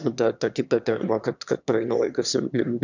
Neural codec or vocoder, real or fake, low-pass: autoencoder, 22.05 kHz, a latent of 192 numbers a frame, VITS, trained on one speaker; fake; 7.2 kHz